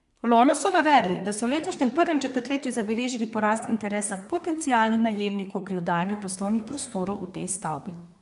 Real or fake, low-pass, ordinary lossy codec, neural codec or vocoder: fake; 10.8 kHz; none; codec, 24 kHz, 1 kbps, SNAC